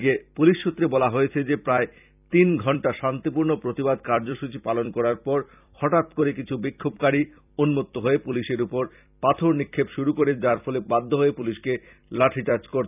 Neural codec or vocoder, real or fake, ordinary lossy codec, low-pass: vocoder, 44.1 kHz, 128 mel bands every 256 samples, BigVGAN v2; fake; none; 3.6 kHz